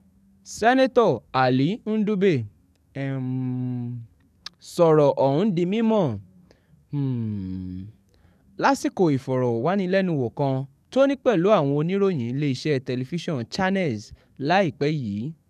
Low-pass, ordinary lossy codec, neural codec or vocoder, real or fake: 14.4 kHz; none; codec, 44.1 kHz, 7.8 kbps, DAC; fake